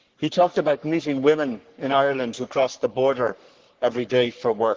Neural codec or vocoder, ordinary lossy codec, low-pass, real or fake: codec, 44.1 kHz, 3.4 kbps, Pupu-Codec; Opus, 16 kbps; 7.2 kHz; fake